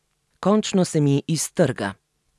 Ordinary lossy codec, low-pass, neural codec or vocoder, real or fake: none; none; none; real